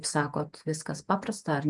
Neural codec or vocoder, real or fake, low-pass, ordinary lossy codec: vocoder, 48 kHz, 128 mel bands, Vocos; fake; 10.8 kHz; MP3, 96 kbps